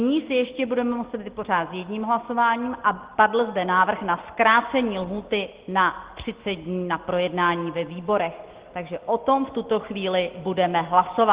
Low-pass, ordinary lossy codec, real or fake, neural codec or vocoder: 3.6 kHz; Opus, 16 kbps; real; none